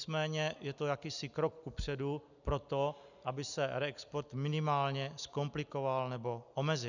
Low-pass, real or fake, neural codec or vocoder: 7.2 kHz; real; none